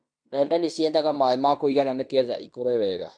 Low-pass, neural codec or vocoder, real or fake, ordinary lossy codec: 9.9 kHz; codec, 16 kHz in and 24 kHz out, 0.9 kbps, LongCat-Audio-Codec, fine tuned four codebook decoder; fake; none